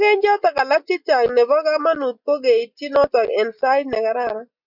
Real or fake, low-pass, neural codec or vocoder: real; 5.4 kHz; none